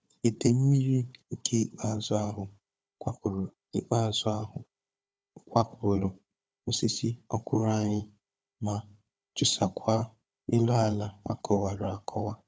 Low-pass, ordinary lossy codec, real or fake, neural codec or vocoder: none; none; fake; codec, 16 kHz, 4 kbps, FunCodec, trained on Chinese and English, 50 frames a second